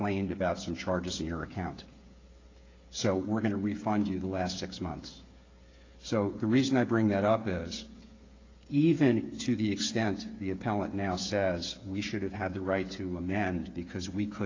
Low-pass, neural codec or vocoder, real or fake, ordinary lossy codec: 7.2 kHz; codec, 16 kHz, 8 kbps, FreqCodec, smaller model; fake; AAC, 32 kbps